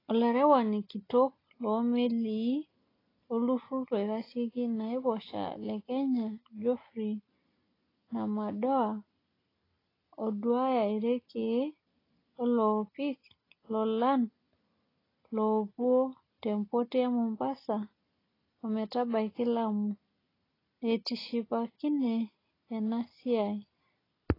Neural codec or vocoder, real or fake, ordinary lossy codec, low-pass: none; real; AAC, 24 kbps; 5.4 kHz